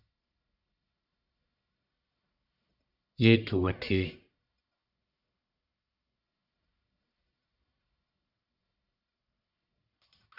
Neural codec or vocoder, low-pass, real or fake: codec, 44.1 kHz, 1.7 kbps, Pupu-Codec; 5.4 kHz; fake